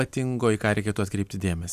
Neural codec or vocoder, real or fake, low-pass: none; real; 14.4 kHz